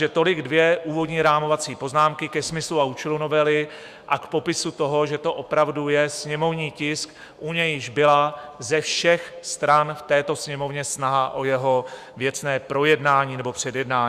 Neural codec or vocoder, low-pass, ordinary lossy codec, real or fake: autoencoder, 48 kHz, 128 numbers a frame, DAC-VAE, trained on Japanese speech; 14.4 kHz; Opus, 64 kbps; fake